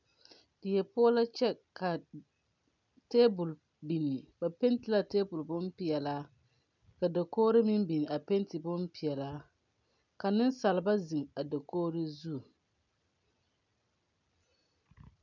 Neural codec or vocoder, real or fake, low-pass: none; real; 7.2 kHz